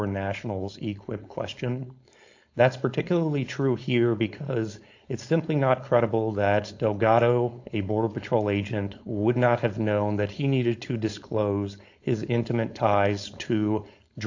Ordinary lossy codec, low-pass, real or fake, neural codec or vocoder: AAC, 48 kbps; 7.2 kHz; fake; codec, 16 kHz, 4.8 kbps, FACodec